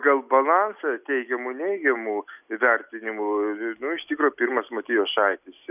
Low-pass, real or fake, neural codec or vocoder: 3.6 kHz; real; none